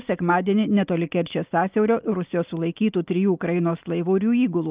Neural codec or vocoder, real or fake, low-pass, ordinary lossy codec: none; real; 3.6 kHz; Opus, 32 kbps